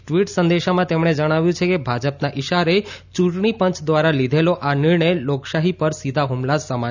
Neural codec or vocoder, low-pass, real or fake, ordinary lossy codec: none; 7.2 kHz; real; none